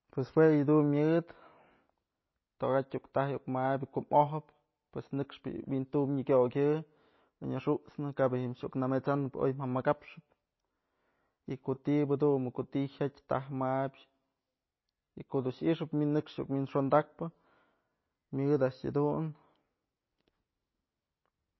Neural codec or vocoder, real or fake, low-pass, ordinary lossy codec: none; real; 7.2 kHz; MP3, 24 kbps